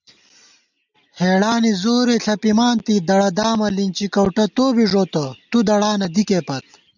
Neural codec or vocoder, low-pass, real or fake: none; 7.2 kHz; real